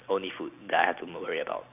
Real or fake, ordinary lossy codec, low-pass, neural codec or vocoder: real; none; 3.6 kHz; none